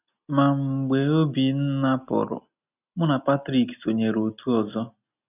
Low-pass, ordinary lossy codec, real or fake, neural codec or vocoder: 3.6 kHz; none; real; none